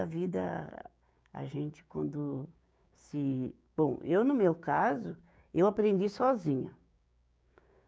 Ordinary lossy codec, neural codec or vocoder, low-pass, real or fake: none; codec, 16 kHz, 6 kbps, DAC; none; fake